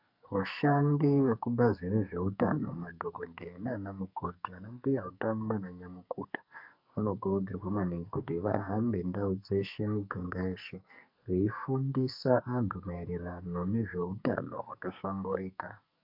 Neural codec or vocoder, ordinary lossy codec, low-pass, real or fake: codec, 44.1 kHz, 2.6 kbps, SNAC; MP3, 48 kbps; 5.4 kHz; fake